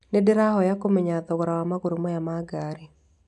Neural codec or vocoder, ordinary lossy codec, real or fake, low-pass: none; none; real; 10.8 kHz